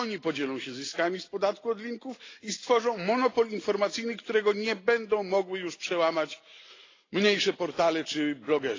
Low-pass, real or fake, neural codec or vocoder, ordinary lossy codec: 7.2 kHz; real; none; AAC, 32 kbps